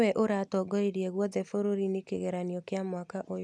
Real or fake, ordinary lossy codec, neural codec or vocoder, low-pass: real; none; none; none